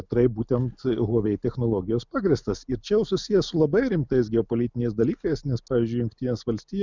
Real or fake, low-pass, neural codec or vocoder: real; 7.2 kHz; none